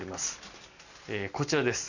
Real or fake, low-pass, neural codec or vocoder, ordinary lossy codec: real; 7.2 kHz; none; none